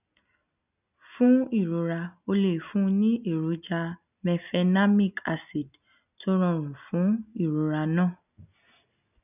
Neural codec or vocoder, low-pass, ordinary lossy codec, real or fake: none; 3.6 kHz; none; real